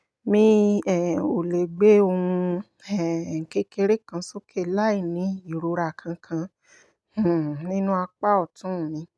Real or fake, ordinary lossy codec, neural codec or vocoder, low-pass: real; none; none; none